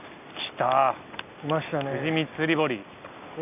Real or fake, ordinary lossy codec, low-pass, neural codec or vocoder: real; none; 3.6 kHz; none